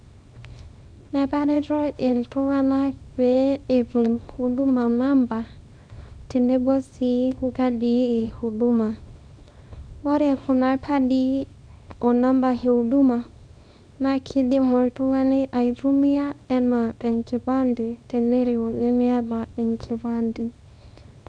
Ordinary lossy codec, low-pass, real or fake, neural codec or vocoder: none; 9.9 kHz; fake; codec, 24 kHz, 0.9 kbps, WavTokenizer, small release